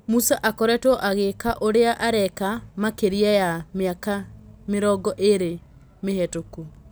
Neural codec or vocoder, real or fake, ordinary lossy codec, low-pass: none; real; none; none